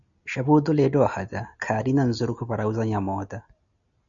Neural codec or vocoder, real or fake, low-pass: none; real; 7.2 kHz